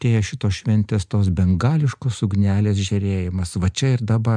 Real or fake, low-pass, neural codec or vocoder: real; 9.9 kHz; none